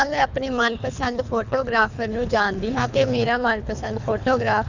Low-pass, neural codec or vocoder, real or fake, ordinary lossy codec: 7.2 kHz; codec, 24 kHz, 3 kbps, HILCodec; fake; none